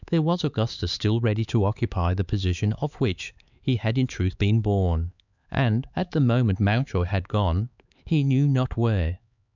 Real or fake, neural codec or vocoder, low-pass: fake; codec, 16 kHz, 4 kbps, X-Codec, HuBERT features, trained on LibriSpeech; 7.2 kHz